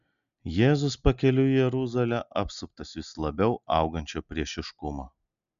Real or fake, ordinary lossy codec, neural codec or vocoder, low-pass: real; MP3, 96 kbps; none; 7.2 kHz